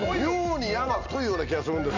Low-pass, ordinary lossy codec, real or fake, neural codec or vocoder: 7.2 kHz; none; real; none